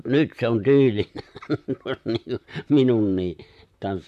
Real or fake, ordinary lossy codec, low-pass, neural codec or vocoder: fake; MP3, 96 kbps; 14.4 kHz; vocoder, 44.1 kHz, 128 mel bands, Pupu-Vocoder